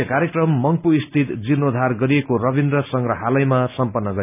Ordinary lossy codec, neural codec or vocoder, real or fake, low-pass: none; none; real; 3.6 kHz